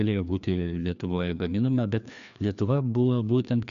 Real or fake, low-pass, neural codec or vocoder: fake; 7.2 kHz; codec, 16 kHz, 2 kbps, FreqCodec, larger model